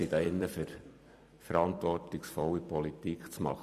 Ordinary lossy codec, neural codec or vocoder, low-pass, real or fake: none; vocoder, 44.1 kHz, 128 mel bands every 256 samples, BigVGAN v2; 14.4 kHz; fake